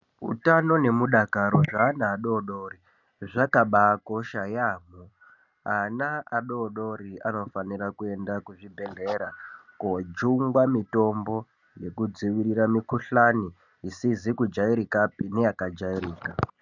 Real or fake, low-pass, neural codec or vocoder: real; 7.2 kHz; none